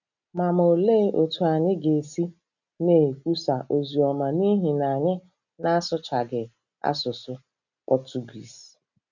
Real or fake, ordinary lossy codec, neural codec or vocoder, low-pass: real; none; none; 7.2 kHz